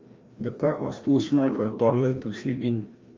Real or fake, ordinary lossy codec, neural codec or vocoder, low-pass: fake; Opus, 32 kbps; codec, 16 kHz, 1 kbps, FreqCodec, larger model; 7.2 kHz